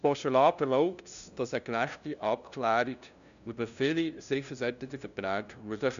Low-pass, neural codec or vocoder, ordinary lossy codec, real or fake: 7.2 kHz; codec, 16 kHz, 0.5 kbps, FunCodec, trained on LibriTTS, 25 frames a second; none; fake